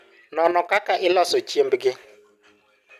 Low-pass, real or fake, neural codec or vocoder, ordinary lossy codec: 14.4 kHz; real; none; none